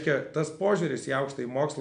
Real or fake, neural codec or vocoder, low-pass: real; none; 9.9 kHz